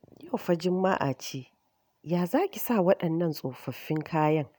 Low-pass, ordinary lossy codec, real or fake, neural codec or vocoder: none; none; real; none